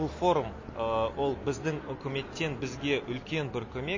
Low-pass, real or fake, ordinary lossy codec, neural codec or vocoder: 7.2 kHz; real; MP3, 32 kbps; none